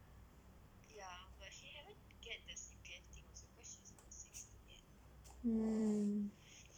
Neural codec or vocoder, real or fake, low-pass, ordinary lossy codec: vocoder, 44.1 kHz, 128 mel bands every 256 samples, BigVGAN v2; fake; 19.8 kHz; none